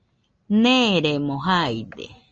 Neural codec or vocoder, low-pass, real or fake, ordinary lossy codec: none; 7.2 kHz; real; Opus, 24 kbps